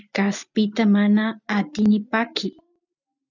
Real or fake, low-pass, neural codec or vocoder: real; 7.2 kHz; none